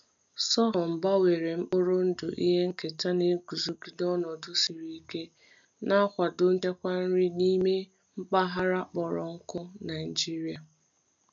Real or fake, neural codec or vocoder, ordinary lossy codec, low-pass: real; none; MP3, 64 kbps; 7.2 kHz